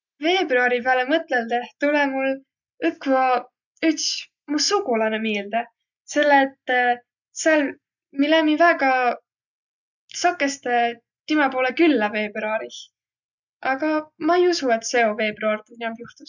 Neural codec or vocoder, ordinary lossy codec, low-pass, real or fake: none; none; 7.2 kHz; real